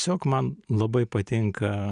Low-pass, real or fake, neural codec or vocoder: 9.9 kHz; real; none